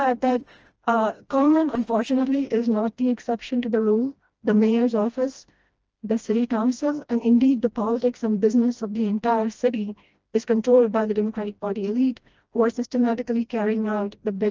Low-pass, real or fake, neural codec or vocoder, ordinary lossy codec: 7.2 kHz; fake; codec, 16 kHz, 1 kbps, FreqCodec, smaller model; Opus, 24 kbps